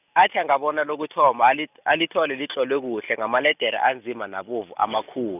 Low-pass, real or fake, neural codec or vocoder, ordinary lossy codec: 3.6 kHz; real; none; none